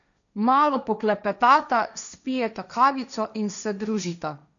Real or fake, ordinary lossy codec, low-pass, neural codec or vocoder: fake; none; 7.2 kHz; codec, 16 kHz, 1.1 kbps, Voila-Tokenizer